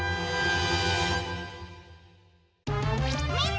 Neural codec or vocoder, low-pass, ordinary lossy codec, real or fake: none; none; none; real